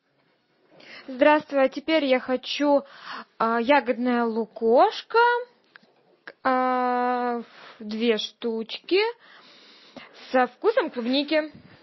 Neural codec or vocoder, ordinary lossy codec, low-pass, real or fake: none; MP3, 24 kbps; 7.2 kHz; real